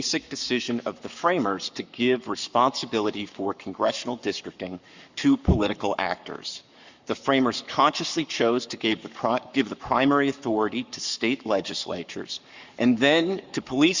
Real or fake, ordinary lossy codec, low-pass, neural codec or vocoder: fake; Opus, 64 kbps; 7.2 kHz; codec, 44.1 kHz, 7.8 kbps, Pupu-Codec